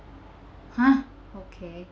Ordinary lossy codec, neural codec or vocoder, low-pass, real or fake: none; none; none; real